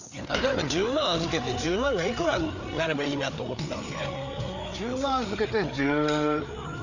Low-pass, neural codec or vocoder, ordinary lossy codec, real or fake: 7.2 kHz; codec, 16 kHz, 4 kbps, FreqCodec, larger model; none; fake